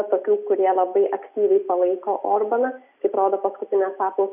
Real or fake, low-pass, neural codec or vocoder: real; 3.6 kHz; none